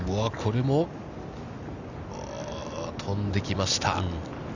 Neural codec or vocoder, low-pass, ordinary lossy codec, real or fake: none; 7.2 kHz; none; real